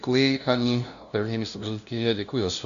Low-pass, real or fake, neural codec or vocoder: 7.2 kHz; fake; codec, 16 kHz, 0.5 kbps, FunCodec, trained on LibriTTS, 25 frames a second